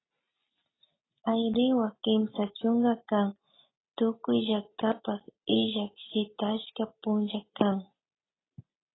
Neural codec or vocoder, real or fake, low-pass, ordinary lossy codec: none; real; 7.2 kHz; AAC, 16 kbps